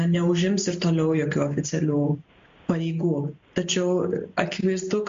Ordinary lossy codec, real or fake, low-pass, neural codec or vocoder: MP3, 48 kbps; real; 7.2 kHz; none